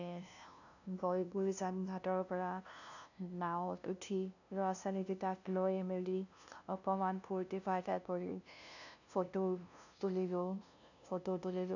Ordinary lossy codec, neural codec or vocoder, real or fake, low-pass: none; codec, 16 kHz, 0.5 kbps, FunCodec, trained on LibriTTS, 25 frames a second; fake; 7.2 kHz